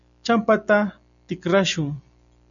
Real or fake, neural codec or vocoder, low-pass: real; none; 7.2 kHz